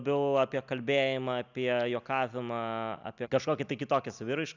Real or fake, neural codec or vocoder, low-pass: real; none; 7.2 kHz